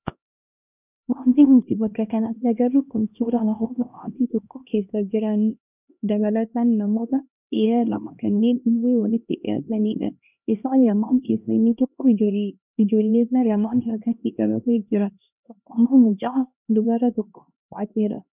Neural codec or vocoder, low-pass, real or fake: codec, 16 kHz, 1 kbps, X-Codec, HuBERT features, trained on LibriSpeech; 3.6 kHz; fake